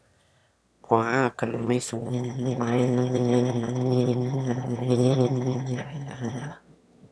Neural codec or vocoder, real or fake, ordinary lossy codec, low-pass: autoencoder, 22.05 kHz, a latent of 192 numbers a frame, VITS, trained on one speaker; fake; none; none